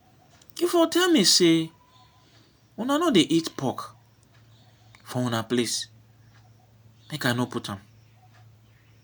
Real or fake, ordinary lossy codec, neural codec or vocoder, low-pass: real; none; none; none